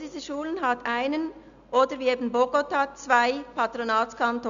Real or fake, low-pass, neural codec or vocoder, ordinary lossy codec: real; 7.2 kHz; none; none